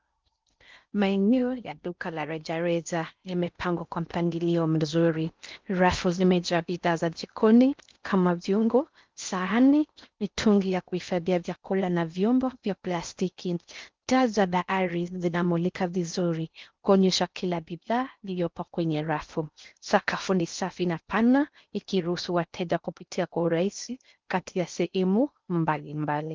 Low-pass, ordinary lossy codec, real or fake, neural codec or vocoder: 7.2 kHz; Opus, 32 kbps; fake; codec, 16 kHz in and 24 kHz out, 0.6 kbps, FocalCodec, streaming, 4096 codes